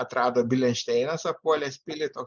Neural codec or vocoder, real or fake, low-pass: none; real; 7.2 kHz